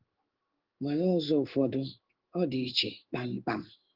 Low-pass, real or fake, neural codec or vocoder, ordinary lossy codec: 5.4 kHz; fake; codec, 16 kHz in and 24 kHz out, 1 kbps, XY-Tokenizer; Opus, 16 kbps